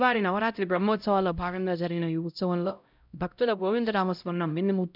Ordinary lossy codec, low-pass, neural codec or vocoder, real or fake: none; 5.4 kHz; codec, 16 kHz, 0.5 kbps, X-Codec, HuBERT features, trained on LibriSpeech; fake